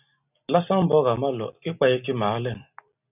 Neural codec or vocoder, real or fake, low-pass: none; real; 3.6 kHz